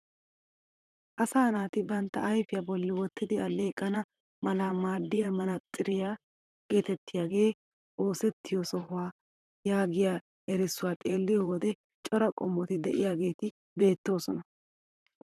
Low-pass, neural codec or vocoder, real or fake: 14.4 kHz; vocoder, 44.1 kHz, 128 mel bands, Pupu-Vocoder; fake